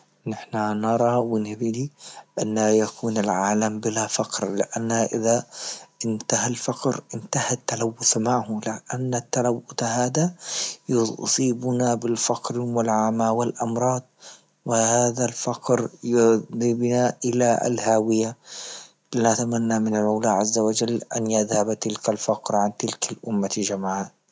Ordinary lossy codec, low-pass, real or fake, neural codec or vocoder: none; none; real; none